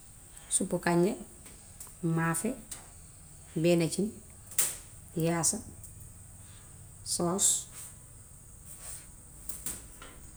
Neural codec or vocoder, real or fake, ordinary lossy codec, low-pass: none; real; none; none